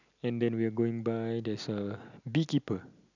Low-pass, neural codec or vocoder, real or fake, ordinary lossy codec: 7.2 kHz; none; real; none